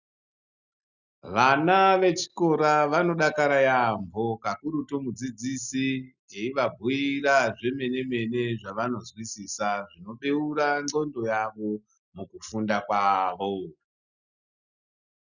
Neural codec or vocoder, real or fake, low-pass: none; real; 7.2 kHz